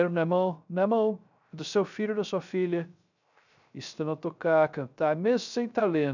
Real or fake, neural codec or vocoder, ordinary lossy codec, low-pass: fake; codec, 16 kHz, 0.3 kbps, FocalCodec; none; 7.2 kHz